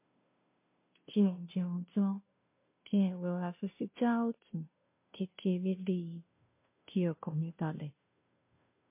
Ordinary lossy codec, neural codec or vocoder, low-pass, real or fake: MP3, 24 kbps; codec, 16 kHz, 0.5 kbps, FunCodec, trained on Chinese and English, 25 frames a second; 3.6 kHz; fake